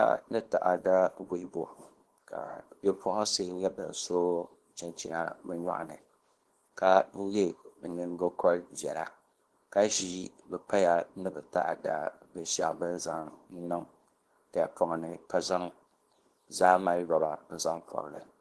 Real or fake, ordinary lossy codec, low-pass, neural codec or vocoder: fake; Opus, 16 kbps; 10.8 kHz; codec, 24 kHz, 0.9 kbps, WavTokenizer, small release